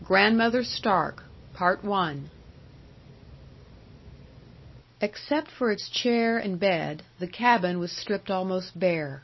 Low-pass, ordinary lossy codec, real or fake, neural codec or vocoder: 7.2 kHz; MP3, 24 kbps; real; none